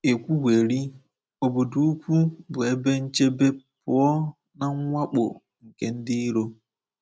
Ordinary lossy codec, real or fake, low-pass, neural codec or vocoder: none; real; none; none